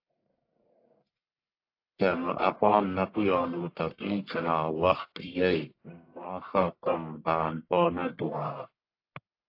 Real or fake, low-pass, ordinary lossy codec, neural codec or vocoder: fake; 5.4 kHz; AAC, 32 kbps; codec, 44.1 kHz, 1.7 kbps, Pupu-Codec